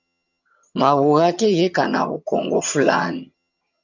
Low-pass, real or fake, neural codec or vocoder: 7.2 kHz; fake; vocoder, 22.05 kHz, 80 mel bands, HiFi-GAN